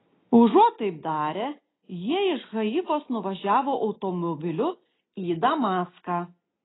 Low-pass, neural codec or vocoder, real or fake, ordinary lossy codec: 7.2 kHz; none; real; AAC, 16 kbps